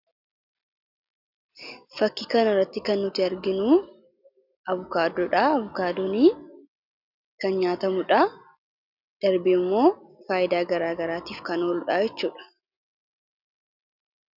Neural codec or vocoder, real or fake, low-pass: none; real; 5.4 kHz